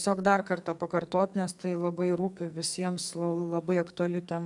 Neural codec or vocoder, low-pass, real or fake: codec, 44.1 kHz, 2.6 kbps, SNAC; 10.8 kHz; fake